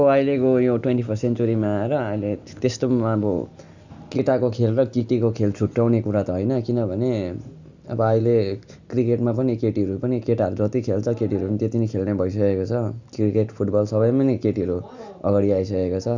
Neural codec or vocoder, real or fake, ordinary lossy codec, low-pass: none; real; none; 7.2 kHz